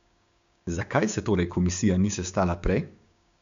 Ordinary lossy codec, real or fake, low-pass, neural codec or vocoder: MP3, 64 kbps; fake; 7.2 kHz; codec, 16 kHz, 6 kbps, DAC